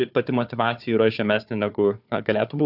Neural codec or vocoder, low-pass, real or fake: codec, 16 kHz, 4 kbps, FunCodec, trained on Chinese and English, 50 frames a second; 5.4 kHz; fake